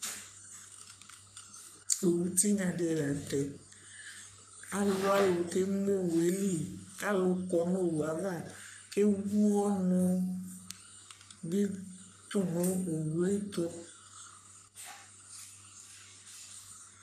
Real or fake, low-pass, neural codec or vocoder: fake; 14.4 kHz; codec, 44.1 kHz, 3.4 kbps, Pupu-Codec